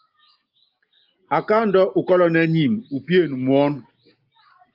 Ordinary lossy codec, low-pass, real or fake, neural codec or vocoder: Opus, 32 kbps; 5.4 kHz; real; none